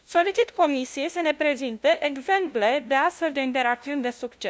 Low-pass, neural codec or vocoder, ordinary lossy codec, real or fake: none; codec, 16 kHz, 0.5 kbps, FunCodec, trained on LibriTTS, 25 frames a second; none; fake